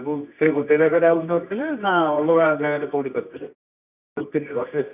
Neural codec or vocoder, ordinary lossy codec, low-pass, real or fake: codec, 24 kHz, 0.9 kbps, WavTokenizer, medium music audio release; none; 3.6 kHz; fake